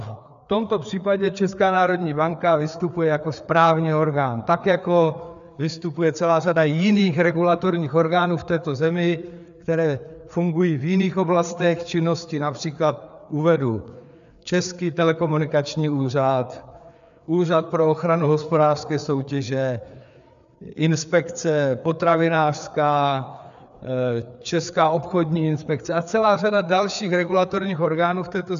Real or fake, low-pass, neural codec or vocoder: fake; 7.2 kHz; codec, 16 kHz, 4 kbps, FreqCodec, larger model